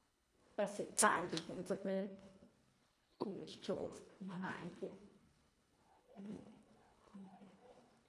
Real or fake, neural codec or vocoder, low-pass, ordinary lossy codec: fake; codec, 24 kHz, 1.5 kbps, HILCodec; none; none